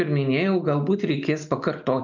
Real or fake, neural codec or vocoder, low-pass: real; none; 7.2 kHz